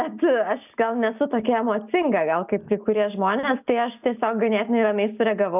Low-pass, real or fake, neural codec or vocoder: 3.6 kHz; real; none